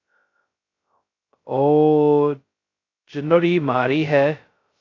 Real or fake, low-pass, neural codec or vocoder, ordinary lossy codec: fake; 7.2 kHz; codec, 16 kHz, 0.2 kbps, FocalCodec; AAC, 32 kbps